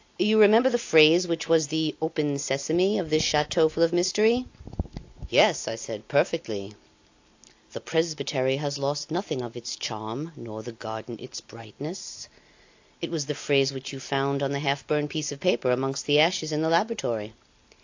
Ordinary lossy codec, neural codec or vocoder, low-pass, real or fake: AAC, 48 kbps; none; 7.2 kHz; real